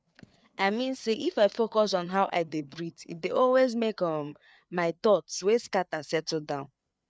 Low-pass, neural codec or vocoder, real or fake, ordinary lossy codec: none; codec, 16 kHz, 4 kbps, FreqCodec, larger model; fake; none